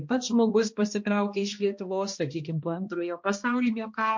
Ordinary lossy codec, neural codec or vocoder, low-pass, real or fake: MP3, 48 kbps; codec, 16 kHz, 1 kbps, X-Codec, HuBERT features, trained on balanced general audio; 7.2 kHz; fake